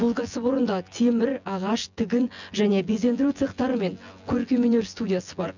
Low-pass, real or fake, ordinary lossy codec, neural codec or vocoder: 7.2 kHz; fake; none; vocoder, 24 kHz, 100 mel bands, Vocos